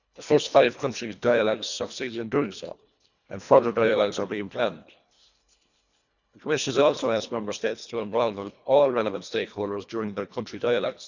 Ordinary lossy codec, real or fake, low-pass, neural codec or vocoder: none; fake; 7.2 kHz; codec, 24 kHz, 1.5 kbps, HILCodec